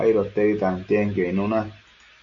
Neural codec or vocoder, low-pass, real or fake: none; 7.2 kHz; real